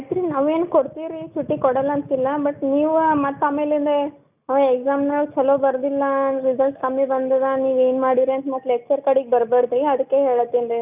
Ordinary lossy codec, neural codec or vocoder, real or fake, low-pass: none; none; real; 3.6 kHz